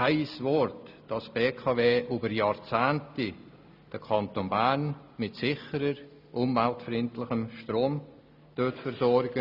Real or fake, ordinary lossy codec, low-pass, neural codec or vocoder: real; none; 5.4 kHz; none